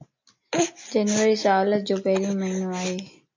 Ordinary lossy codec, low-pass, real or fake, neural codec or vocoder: MP3, 48 kbps; 7.2 kHz; real; none